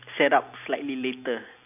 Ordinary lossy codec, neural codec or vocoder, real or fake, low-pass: none; none; real; 3.6 kHz